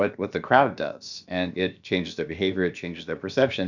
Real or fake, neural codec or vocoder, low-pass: fake; codec, 16 kHz, about 1 kbps, DyCAST, with the encoder's durations; 7.2 kHz